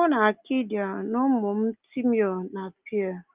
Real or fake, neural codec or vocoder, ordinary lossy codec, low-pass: real; none; Opus, 32 kbps; 3.6 kHz